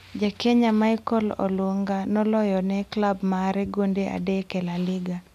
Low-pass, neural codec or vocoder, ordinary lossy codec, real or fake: 14.4 kHz; none; none; real